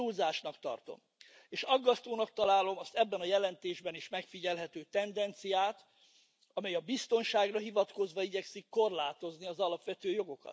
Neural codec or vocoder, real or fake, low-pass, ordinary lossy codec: none; real; none; none